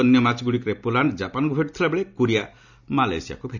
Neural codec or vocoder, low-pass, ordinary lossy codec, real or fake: none; 7.2 kHz; none; real